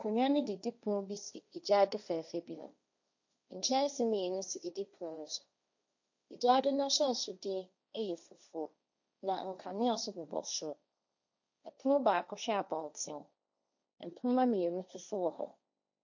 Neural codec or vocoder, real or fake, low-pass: codec, 16 kHz, 1.1 kbps, Voila-Tokenizer; fake; 7.2 kHz